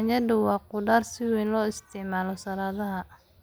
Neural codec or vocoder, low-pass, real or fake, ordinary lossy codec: none; none; real; none